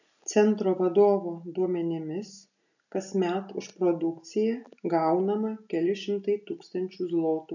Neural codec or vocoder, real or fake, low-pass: none; real; 7.2 kHz